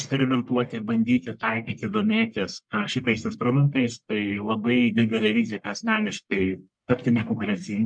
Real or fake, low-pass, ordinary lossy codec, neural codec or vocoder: fake; 9.9 kHz; MP3, 64 kbps; codec, 44.1 kHz, 1.7 kbps, Pupu-Codec